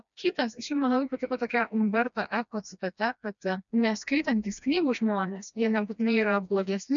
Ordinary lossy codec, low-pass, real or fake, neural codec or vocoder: MP3, 96 kbps; 7.2 kHz; fake; codec, 16 kHz, 1 kbps, FreqCodec, smaller model